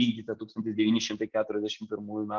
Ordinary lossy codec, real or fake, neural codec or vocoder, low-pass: Opus, 16 kbps; real; none; 7.2 kHz